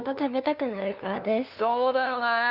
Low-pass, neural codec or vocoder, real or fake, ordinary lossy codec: 5.4 kHz; codec, 16 kHz, 2 kbps, FunCodec, trained on LibriTTS, 25 frames a second; fake; none